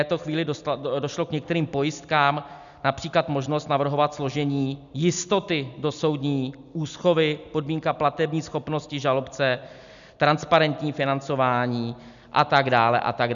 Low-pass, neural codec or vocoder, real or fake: 7.2 kHz; none; real